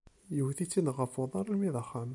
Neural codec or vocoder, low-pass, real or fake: none; 10.8 kHz; real